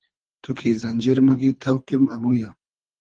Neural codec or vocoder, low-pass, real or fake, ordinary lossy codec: codec, 24 kHz, 3 kbps, HILCodec; 9.9 kHz; fake; Opus, 24 kbps